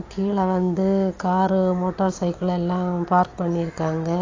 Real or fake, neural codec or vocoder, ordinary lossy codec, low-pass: real; none; AAC, 32 kbps; 7.2 kHz